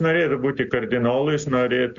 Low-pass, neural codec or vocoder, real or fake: 7.2 kHz; none; real